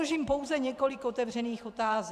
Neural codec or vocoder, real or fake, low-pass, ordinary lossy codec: vocoder, 44.1 kHz, 128 mel bands every 512 samples, BigVGAN v2; fake; 14.4 kHz; AAC, 96 kbps